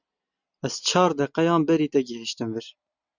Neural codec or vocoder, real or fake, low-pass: none; real; 7.2 kHz